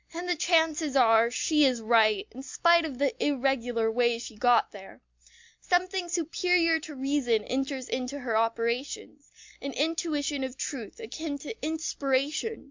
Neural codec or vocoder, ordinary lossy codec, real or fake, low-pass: none; MP3, 64 kbps; real; 7.2 kHz